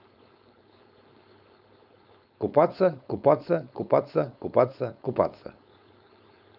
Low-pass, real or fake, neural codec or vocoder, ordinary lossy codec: 5.4 kHz; fake; codec, 16 kHz, 4.8 kbps, FACodec; none